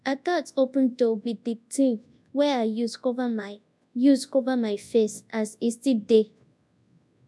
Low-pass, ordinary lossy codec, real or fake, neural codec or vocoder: none; none; fake; codec, 24 kHz, 0.9 kbps, WavTokenizer, large speech release